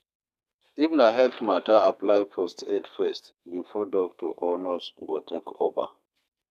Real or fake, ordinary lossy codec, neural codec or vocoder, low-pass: fake; none; codec, 32 kHz, 1.9 kbps, SNAC; 14.4 kHz